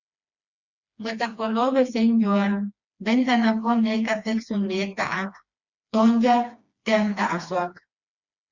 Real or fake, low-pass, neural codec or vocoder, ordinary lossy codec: fake; 7.2 kHz; codec, 16 kHz, 2 kbps, FreqCodec, smaller model; Opus, 64 kbps